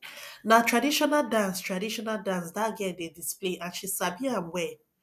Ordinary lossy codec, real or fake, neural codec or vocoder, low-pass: none; real; none; 14.4 kHz